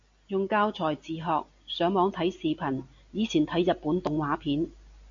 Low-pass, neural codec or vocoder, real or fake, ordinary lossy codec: 7.2 kHz; none; real; AAC, 64 kbps